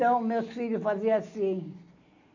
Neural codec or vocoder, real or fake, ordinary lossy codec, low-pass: none; real; AAC, 48 kbps; 7.2 kHz